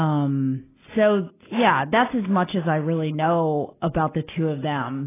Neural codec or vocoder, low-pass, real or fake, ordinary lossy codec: none; 3.6 kHz; real; AAC, 16 kbps